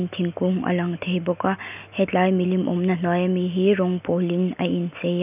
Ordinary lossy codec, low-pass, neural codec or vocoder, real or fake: none; 3.6 kHz; none; real